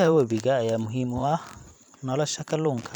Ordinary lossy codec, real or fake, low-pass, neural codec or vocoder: none; fake; 19.8 kHz; vocoder, 44.1 kHz, 128 mel bands every 512 samples, BigVGAN v2